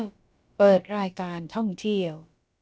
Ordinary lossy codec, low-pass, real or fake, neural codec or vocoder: none; none; fake; codec, 16 kHz, about 1 kbps, DyCAST, with the encoder's durations